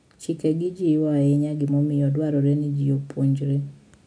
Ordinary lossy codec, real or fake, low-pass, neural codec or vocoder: none; real; 9.9 kHz; none